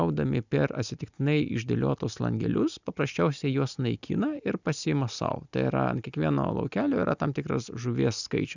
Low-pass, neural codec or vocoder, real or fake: 7.2 kHz; none; real